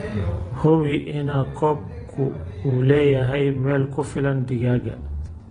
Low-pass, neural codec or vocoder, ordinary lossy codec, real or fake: 9.9 kHz; vocoder, 22.05 kHz, 80 mel bands, WaveNeXt; AAC, 32 kbps; fake